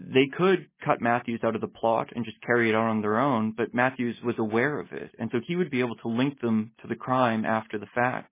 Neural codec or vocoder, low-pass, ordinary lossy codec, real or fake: none; 3.6 kHz; MP3, 16 kbps; real